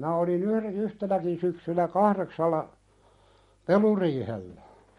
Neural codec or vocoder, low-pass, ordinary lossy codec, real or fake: none; 19.8 kHz; MP3, 48 kbps; real